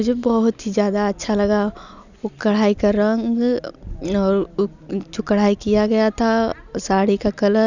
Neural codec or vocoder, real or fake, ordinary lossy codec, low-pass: none; real; none; 7.2 kHz